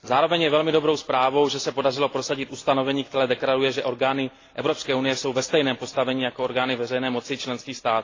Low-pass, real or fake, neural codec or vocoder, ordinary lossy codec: 7.2 kHz; real; none; AAC, 32 kbps